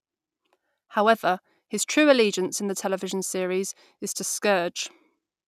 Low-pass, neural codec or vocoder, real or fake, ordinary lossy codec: 14.4 kHz; none; real; none